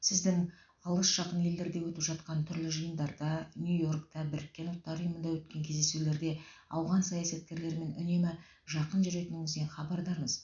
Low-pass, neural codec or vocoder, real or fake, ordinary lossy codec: 7.2 kHz; none; real; none